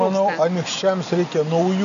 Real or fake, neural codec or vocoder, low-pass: real; none; 7.2 kHz